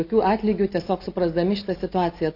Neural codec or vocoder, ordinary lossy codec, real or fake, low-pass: none; AAC, 48 kbps; real; 5.4 kHz